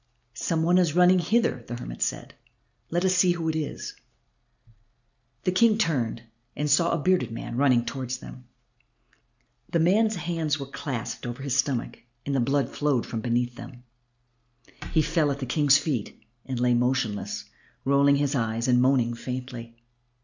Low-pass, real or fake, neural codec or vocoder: 7.2 kHz; real; none